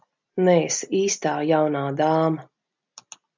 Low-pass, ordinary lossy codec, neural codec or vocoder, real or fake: 7.2 kHz; MP3, 64 kbps; none; real